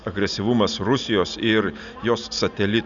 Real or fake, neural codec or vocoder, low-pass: real; none; 7.2 kHz